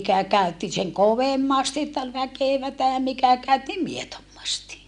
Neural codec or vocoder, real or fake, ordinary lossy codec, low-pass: none; real; MP3, 96 kbps; 10.8 kHz